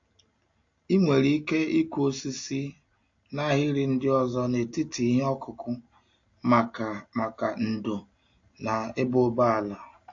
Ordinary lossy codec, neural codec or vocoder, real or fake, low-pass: AAC, 48 kbps; none; real; 7.2 kHz